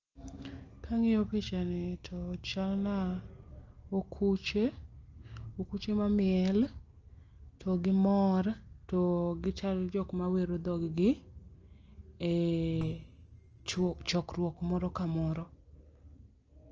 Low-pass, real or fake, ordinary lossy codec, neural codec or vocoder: 7.2 kHz; real; Opus, 24 kbps; none